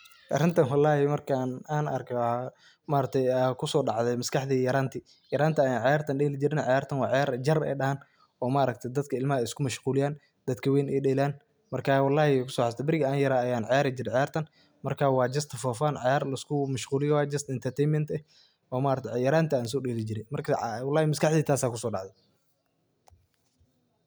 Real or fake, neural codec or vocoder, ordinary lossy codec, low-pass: real; none; none; none